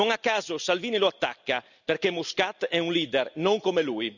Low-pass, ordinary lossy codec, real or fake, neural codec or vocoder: 7.2 kHz; none; real; none